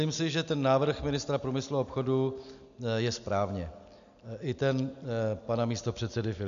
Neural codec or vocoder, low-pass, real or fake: none; 7.2 kHz; real